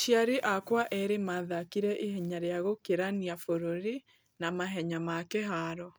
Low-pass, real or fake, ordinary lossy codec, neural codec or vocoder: none; real; none; none